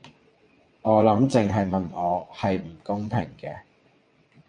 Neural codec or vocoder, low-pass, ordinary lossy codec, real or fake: vocoder, 22.05 kHz, 80 mel bands, WaveNeXt; 9.9 kHz; MP3, 48 kbps; fake